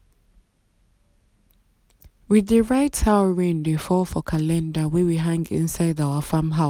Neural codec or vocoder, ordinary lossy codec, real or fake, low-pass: none; none; real; 19.8 kHz